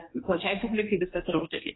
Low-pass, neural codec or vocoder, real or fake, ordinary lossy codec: 7.2 kHz; codec, 16 kHz, 2 kbps, X-Codec, HuBERT features, trained on general audio; fake; AAC, 16 kbps